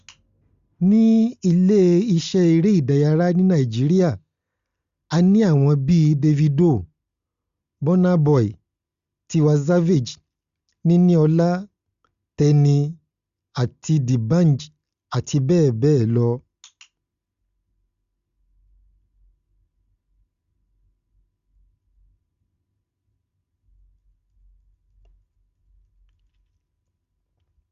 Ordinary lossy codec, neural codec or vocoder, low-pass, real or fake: Opus, 64 kbps; none; 7.2 kHz; real